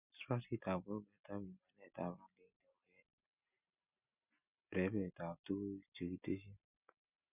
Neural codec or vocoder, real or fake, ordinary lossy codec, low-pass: none; real; none; 3.6 kHz